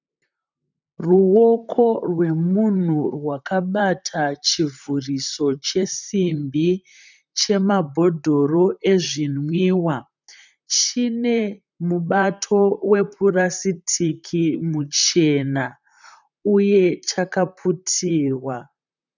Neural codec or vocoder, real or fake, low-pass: vocoder, 44.1 kHz, 128 mel bands, Pupu-Vocoder; fake; 7.2 kHz